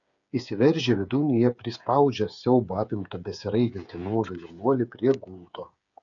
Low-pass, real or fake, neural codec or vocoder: 7.2 kHz; fake; codec, 16 kHz, 8 kbps, FreqCodec, smaller model